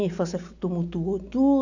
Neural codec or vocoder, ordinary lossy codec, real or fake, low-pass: none; none; real; 7.2 kHz